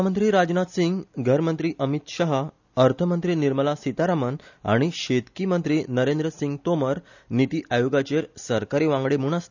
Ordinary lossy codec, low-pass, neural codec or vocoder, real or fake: none; 7.2 kHz; none; real